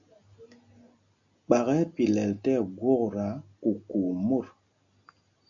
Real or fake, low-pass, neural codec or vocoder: real; 7.2 kHz; none